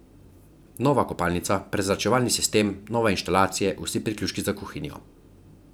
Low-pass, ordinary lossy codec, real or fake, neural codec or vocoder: none; none; fake; vocoder, 44.1 kHz, 128 mel bands every 512 samples, BigVGAN v2